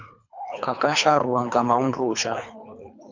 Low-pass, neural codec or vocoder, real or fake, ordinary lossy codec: 7.2 kHz; codec, 24 kHz, 3 kbps, HILCodec; fake; MP3, 64 kbps